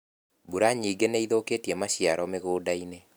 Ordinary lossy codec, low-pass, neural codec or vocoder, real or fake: none; none; none; real